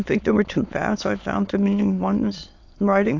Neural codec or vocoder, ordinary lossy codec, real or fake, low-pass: autoencoder, 22.05 kHz, a latent of 192 numbers a frame, VITS, trained on many speakers; AAC, 48 kbps; fake; 7.2 kHz